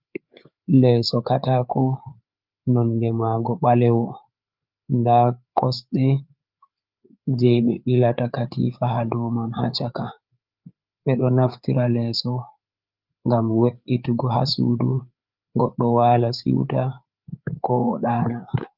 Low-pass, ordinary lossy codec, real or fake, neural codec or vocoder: 5.4 kHz; Opus, 24 kbps; fake; codec, 16 kHz, 4 kbps, FreqCodec, larger model